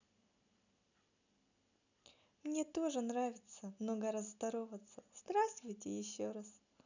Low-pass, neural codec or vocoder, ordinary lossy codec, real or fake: 7.2 kHz; none; none; real